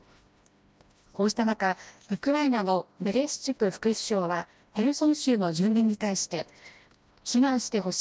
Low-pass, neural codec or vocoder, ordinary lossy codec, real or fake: none; codec, 16 kHz, 1 kbps, FreqCodec, smaller model; none; fake